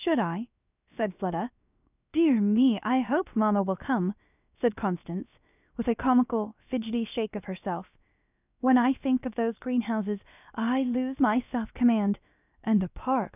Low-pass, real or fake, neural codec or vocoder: 3.6 kHz; fake; codec, 16 kHz, about 1 kbps, DyCAST, with the encoder's durations